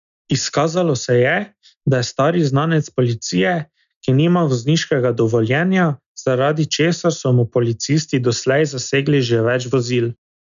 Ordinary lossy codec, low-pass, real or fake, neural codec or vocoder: none; 7.2 kHz; real; none